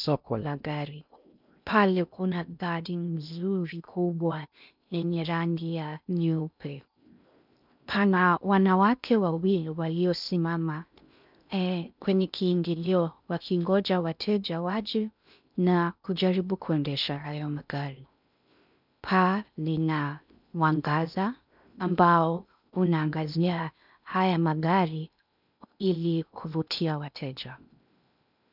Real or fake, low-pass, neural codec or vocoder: fake; 5.4 kHz; codec, 16 kHz in and 24 kHz out, 0.6 kbps, FocalCodec, streaming, 2048 codes